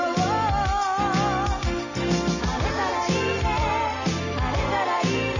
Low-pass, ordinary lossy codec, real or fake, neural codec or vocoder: 7.2 kHz; none; real; none